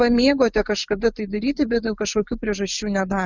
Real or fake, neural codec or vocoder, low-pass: real; none; 7.2 kHz